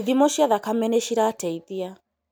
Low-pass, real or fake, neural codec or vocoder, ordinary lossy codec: none; real; none; none